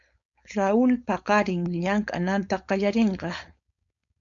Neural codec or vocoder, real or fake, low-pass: codec, 16 kHz, 4.8 kbps, FACodec; fake; 7.2 kHz